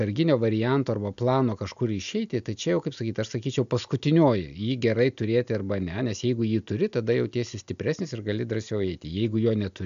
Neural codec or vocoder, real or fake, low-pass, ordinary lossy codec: none; real; 7.2 kHz; AAC, 96 kbps